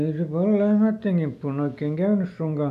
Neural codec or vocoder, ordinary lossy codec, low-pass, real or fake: none; none; 14.4 kHz; real